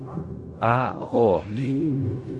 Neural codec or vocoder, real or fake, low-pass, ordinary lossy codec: codec, 16 kHz in and 24 kHz out, 0.4 kbps, LongCat-Audio-Codec, fine tuned four codebook decoder; fake; 10.8 kHz; MP3, 64 kbps